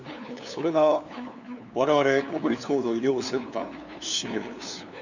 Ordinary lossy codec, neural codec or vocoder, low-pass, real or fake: none; codec, 16 kHz, 2 kbps, FunCodec, trained on LibriTTS, 25 frames a second; 7.2 kHz; fake